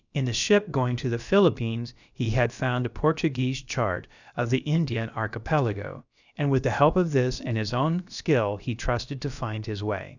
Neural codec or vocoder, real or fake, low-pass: codec, 16 kHz, about 1 kbps, DyCAST, with the encoder's durations; fake; 7.2 kHz